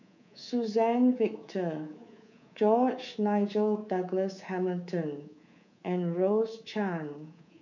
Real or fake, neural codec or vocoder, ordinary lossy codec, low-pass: fake; codec, 24 kHz, 3.1 kbps, DualCodec; none; 7.2 kHz